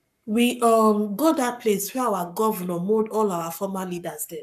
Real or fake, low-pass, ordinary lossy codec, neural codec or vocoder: fake; 14.4 kHz; none; codec, 44.1 kHz, 7.8 kbps, Pupu-Codec